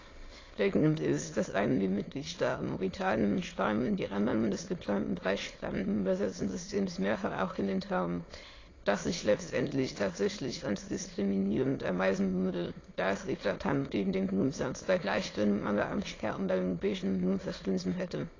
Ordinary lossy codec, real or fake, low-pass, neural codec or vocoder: AAC, 32 kbps; fake; 7.2 kHz; autoencoder, 22.05 kHz, a latent of 192 numbers a frame, VITS, trained on many speakers